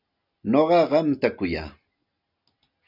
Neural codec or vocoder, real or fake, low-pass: none; real; 5.4 kHz